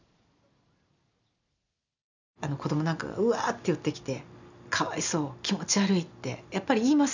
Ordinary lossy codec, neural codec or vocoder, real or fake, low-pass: none; none; real; 7.2 kHz